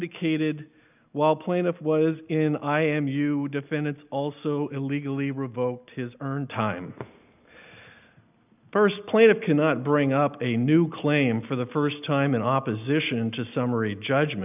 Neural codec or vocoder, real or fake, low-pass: none; real; 3.6 kHz